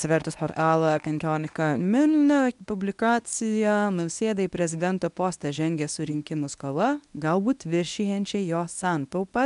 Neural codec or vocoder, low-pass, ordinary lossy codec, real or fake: codec, 24 kHz, 0.9 kbps, WavTokenizer, medium speech release version 1; 10.8 kHz; MP3, 96 kbps; fake